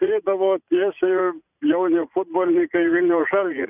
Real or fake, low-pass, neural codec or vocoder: fake; 3.6 kHz; vocoder, 44.1 kHz, 80 mel bands, Vocos